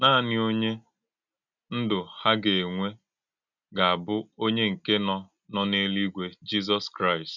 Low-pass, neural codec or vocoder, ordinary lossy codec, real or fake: 7.2 kHz; none; none; real